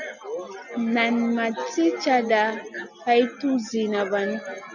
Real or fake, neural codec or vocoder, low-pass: real; none; 7.2 kHz